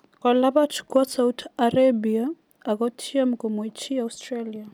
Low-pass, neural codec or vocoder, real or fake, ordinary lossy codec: 19.8 kHz; none; real; none